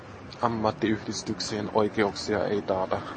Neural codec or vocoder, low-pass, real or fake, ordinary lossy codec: none; 9.9 kHz; real; MP3, 32 kbps